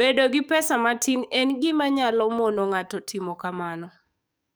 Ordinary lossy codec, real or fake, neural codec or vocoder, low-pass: none; fake; codec, 44.1 kHz, 7.8 kbps, DAC; none